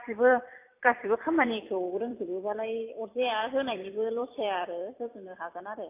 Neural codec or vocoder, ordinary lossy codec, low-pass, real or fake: vocoder, 44.1 kHz, 128 mel bands every 256 samples, BigVGAN v2; AAC, 24 kbps; 3.6 kHz; fake